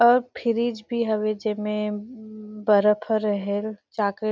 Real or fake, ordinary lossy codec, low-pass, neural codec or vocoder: real; none; none; none